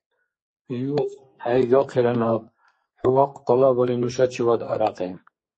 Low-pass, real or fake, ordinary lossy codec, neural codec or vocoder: 10.8 kHz; fake; MP3, 32 kbps; codec, 44.1 kHz, 2.6 kbps, SNAC